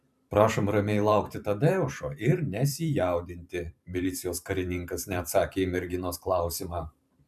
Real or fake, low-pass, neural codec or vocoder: fake; 14.4 kHz; vocoder, 44.1 kHz, 128 mel bands every 512 samples, BigVGAN v2